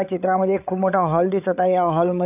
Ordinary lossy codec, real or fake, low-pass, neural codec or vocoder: none; fake; 3.6 kHz; codec, 16 kHz, 8 kbps, FreqCodec, larger model